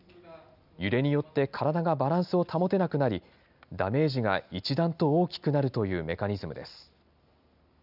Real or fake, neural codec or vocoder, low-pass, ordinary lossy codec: real; none; 5.4 kHz; none